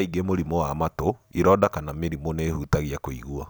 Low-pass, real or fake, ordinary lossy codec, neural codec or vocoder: none; real; none; none